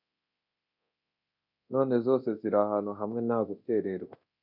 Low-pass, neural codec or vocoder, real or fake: 5.4 kHz; codec, 24 kHz, 0.9 kbps, DualCodec; fake